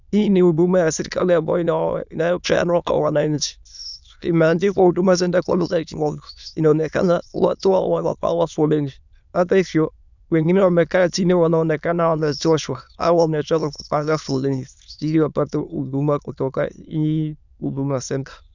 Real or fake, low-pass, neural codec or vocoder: fake; 7.2 kHz; autoencoder, 22.05 kHz, a latent of 192 numbers a frame, VITS, trained on many speakers